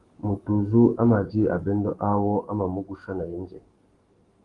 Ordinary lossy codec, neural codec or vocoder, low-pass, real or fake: Opus, 24 kbps; codec, 44.1 kHz, 7.8 kbps, Pupu-Codec; 10.8 kHz; fake